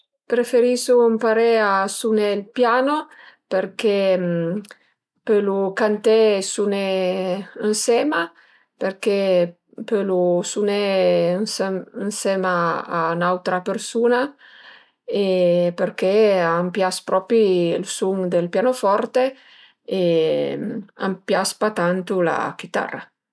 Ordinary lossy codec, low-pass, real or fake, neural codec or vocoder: none; none; real; none